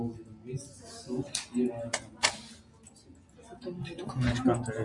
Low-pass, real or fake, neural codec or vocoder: 10.8 kHz; real; none